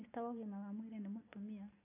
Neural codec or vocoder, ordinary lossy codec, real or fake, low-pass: none; AAC, 24 kbps; real; 3.6 kHz